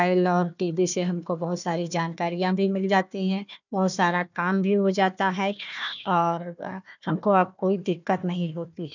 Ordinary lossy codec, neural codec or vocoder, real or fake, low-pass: none; codec, 16 kHz, 1 kbps, FunCodec, trained on Chinese and English, 50 frames a second; fake; 7.2 kHz